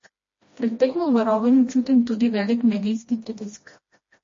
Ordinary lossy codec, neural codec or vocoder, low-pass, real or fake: MP3, 32 kbps; codec, 16 kHz, 1 kbps, FreqCodec, smaller model; 7.2 kHz; fake